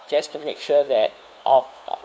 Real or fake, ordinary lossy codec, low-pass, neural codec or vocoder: fake; none; none; codec, 16 kHz, 2 kbps, FunCodec, trained on LibriTTS, 25 frames a second